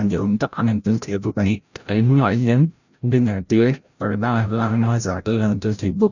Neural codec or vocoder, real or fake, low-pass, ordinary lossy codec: codec, 16 kHz, 0.5 kbps, FreqCodec, larger model; fake; 7.2 kHz; none